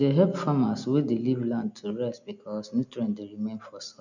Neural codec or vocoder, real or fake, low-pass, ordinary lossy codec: none; real; 7.2 kHz; none